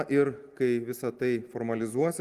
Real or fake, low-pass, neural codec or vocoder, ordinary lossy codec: real; 14.4 kHz; none; Opus, 24 kbps